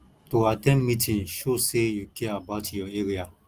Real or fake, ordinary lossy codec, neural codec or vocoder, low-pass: real; Opus, 24 kbps; none; 14.4 kHz